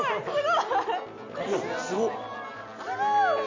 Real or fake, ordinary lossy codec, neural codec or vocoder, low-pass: real; MP3, 48 kbps; none; 7.2 kHz